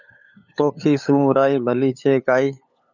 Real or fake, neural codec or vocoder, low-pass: fake; codec, 16 kHz, 8 kbps, FunCodec, trained on LibriTTS, 25 frames a second; 7.2 kHz